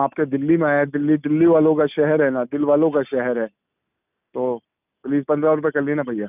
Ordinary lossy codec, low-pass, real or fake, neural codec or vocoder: none; 3.6 kHz; real; none